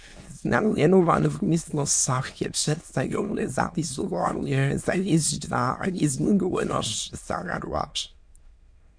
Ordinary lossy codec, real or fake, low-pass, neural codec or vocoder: MP3, 64 kbps; fake; 9.9 kHz; autoencoder, 22.05 kHz, a latent of 192 numbers a frame, VITS, trained on many speakers